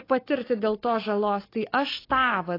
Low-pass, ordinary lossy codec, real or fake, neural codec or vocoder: 5.4 kHz; AAC, 24 kbps; real; none